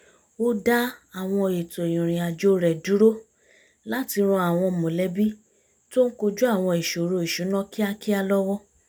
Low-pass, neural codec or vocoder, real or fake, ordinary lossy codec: none; none; real; none